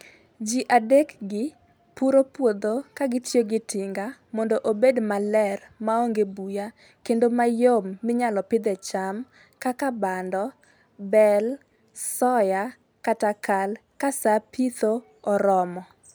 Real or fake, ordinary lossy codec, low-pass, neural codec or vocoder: real; none; none; none